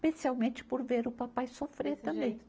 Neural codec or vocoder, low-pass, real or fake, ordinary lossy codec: none; none; real; none